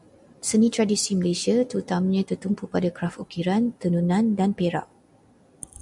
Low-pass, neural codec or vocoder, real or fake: 10.8 kHz; none; real